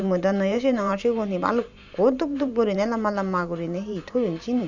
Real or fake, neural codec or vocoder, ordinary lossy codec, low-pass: fake; vocoder, 44.1 kHz, 128 mel bands every 512 samples, BigVGAN v2; none; 7.2 kHz